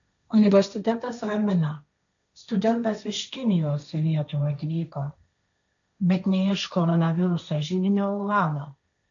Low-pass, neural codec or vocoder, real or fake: 7.2 kHz; codec, 16 kHz, 1.1 kbps, Voila-Tokenizer; fake